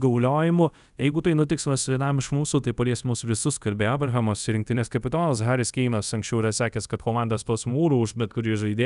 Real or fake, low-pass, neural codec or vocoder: fake; 10.8 kHz; codec, 24 kHz, 0.5 kbps, DualCodec